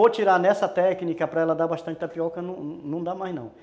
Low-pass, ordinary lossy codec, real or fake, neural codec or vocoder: none; none; real; none